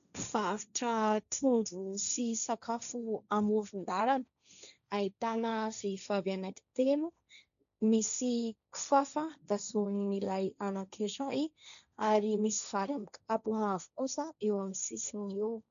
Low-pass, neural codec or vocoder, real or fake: 7.2 kHz; codec, 16 kHz, 1.1 kbps, Voila-Tokenizer; fake